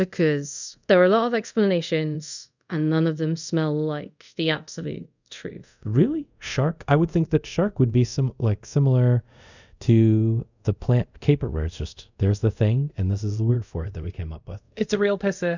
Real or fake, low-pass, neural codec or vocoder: fake; 7.2 kHz; codec, 24 kHz, 0.5 kbps, DualCodec